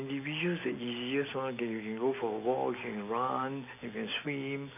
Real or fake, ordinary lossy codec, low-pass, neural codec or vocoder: real; none; 3.6 kHz; none